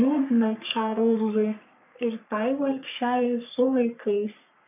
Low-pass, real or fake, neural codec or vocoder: 3.6 kHz; fake; codec, 44.1 kHz, 3.4 kbps, Pupu-Codec